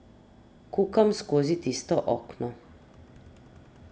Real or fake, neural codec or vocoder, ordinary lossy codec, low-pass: real; none; none; none